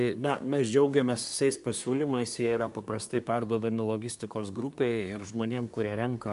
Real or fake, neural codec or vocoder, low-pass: fake; codec, 24 kHz, 1 kbps, SNAC; 10.8 kHz